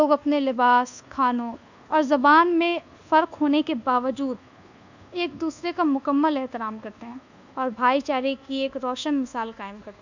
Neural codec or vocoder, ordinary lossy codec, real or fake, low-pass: codec, 24 kHz, 1.2 kbps, DualCodec; none; fake; 7.2 kHz